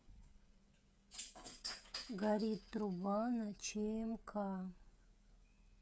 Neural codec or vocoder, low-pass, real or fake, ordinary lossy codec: codec, 16 kHz, 16 kbps, FreqCodec, smaller model; none; fake; none